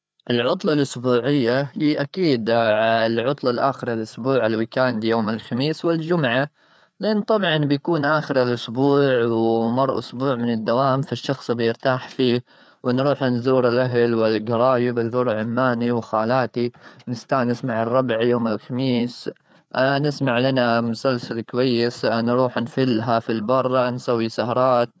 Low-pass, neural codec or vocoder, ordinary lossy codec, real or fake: none; codec, 16 kHz, 4 kbps, FreqCodec, larger model; none; fake